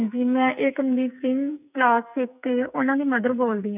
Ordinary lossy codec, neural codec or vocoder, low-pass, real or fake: none; codec, 44.1 kHz, 2.6 kbps, SNAC; 3.6 kHz; fake